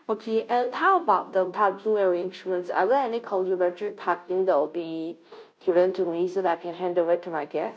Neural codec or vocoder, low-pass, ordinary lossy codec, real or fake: codec, 16 kHz, 0.5 kbps, FunCodec, trained on Chinese and English, 25 frames a second; none; none; fake